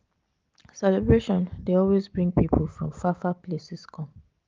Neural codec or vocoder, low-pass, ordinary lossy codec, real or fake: none; 7.2 kHz; Opus, 32 kbps; real